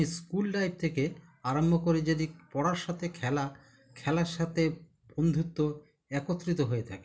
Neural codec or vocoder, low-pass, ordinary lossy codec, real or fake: none; none; none; real